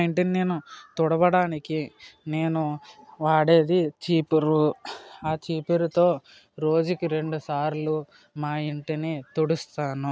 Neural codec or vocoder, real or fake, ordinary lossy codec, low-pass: none; real; none; none